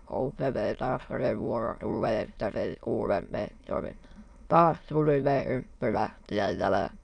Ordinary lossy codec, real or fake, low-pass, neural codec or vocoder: none; fake; 9.9 kHz; autoencoder, 22.05 kHz, a latent of 192 numbers a frame, VITS, trained on many speakers